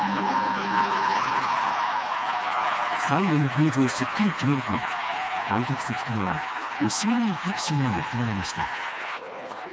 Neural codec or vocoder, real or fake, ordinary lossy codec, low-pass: codec, 16 kHz, 2 kbps, FreqCodec, smaller model; fake; none; none